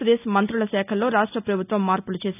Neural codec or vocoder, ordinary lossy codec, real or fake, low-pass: none; none; real; 3.6 kHz